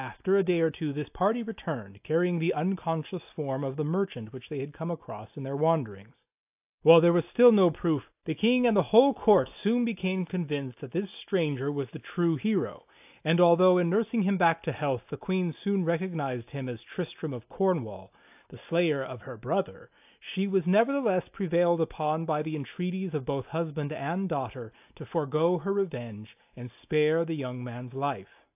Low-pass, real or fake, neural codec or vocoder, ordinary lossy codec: 3.6 kHz; real; none; AAC, 32 kbps